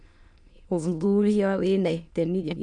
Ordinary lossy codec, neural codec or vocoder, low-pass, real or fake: MP3, 64 kbps; autoencoder, 22.05 kHz, a latent of 192 numbers a frame, VITS, trained on many speakers; 9.9 kHz; fake